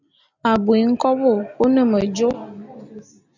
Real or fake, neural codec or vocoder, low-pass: real; none; 7.2 kHz